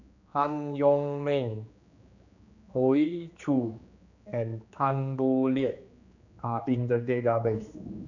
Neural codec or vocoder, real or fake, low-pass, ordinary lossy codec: codec, 16 kHz, 2 kbps, X-Codec, HuBERT features, trained on general audio; fake; 7.2 kHz; none